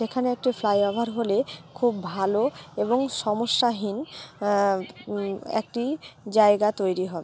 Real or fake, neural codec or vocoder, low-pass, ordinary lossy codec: real; none; none; none